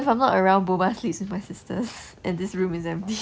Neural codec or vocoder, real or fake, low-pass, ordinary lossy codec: none; real; none; none